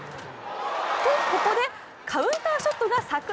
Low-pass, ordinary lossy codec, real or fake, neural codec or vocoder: none; none; real; none